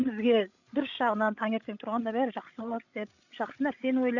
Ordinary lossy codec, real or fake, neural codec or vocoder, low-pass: none; fake; codec, 16 kHz, 16 kbps, FreqCodec, larger model; 7.2 kHz